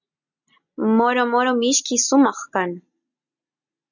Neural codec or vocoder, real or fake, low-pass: none; real; 7.2 kHz